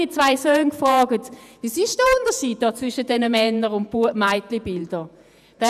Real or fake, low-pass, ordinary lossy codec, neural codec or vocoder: fake; 14.4 kHz; none; vocoder, 48 kHz, 128 mel bands, Vocos